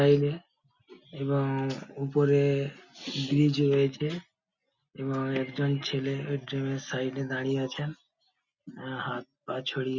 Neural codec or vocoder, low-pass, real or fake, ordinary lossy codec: none; 7.2 kHz; real; Opus, 64 kbps